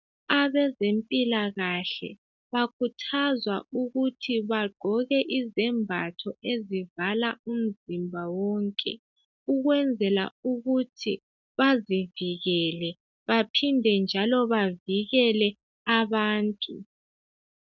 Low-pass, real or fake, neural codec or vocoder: 7.2 kHz; real; none